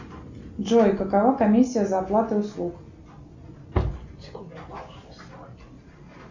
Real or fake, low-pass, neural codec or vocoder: real; 7.2 kHz; none